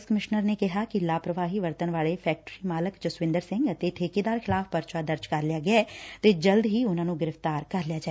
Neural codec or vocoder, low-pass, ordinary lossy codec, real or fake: none; none; none; real